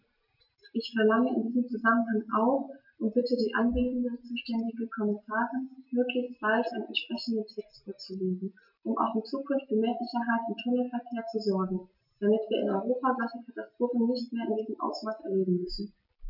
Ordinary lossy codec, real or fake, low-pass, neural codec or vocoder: none; real; 5.4 kHz; none